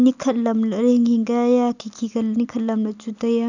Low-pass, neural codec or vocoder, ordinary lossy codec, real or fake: 7.2 kHz; none; none; real